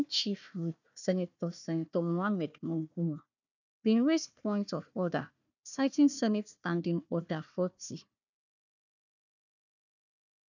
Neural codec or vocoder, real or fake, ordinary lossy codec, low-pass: codec, 16 kHz, 1 kbps, FunCodec, trained on Chinese and English, 50 frames a second; fake; none; 7.2 kHz